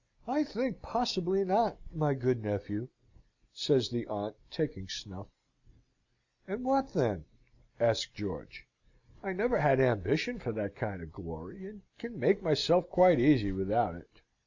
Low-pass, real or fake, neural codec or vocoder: 7.2 kHz; real; none